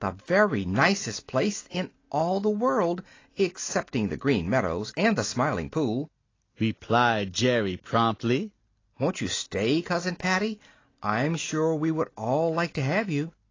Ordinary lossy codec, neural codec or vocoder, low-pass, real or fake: AAC, 32 kbps; none; 7.2 kHz; real